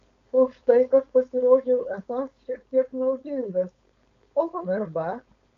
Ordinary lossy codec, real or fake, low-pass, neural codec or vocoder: AAC, 48 kbps; fake; 7.2 kHz; codec, 16 kHz, 4.8 kbps, FACodec